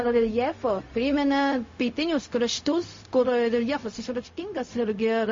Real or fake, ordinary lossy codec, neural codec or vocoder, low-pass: fake; MP3, 32 kbps; codec, 16 kHz, 0.4 kbps, LongCat-Audio-Codec; 7.2 kHz